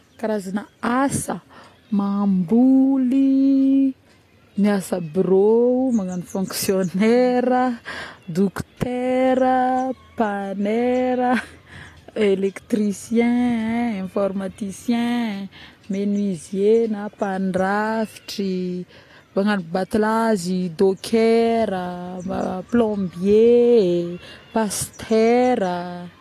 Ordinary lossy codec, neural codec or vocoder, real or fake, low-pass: AAC, 48 kbps; none; real; 14.4 kHz